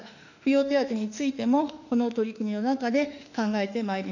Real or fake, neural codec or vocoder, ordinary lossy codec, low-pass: fake; autoencoder, 48 kHz, 32 numbers a frame, DAC-VAE, trained on Japanese speech; none; 7.2 kHz